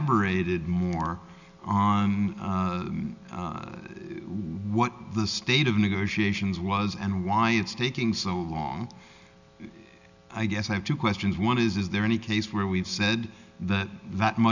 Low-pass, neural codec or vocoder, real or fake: 7.2 kHz; none; real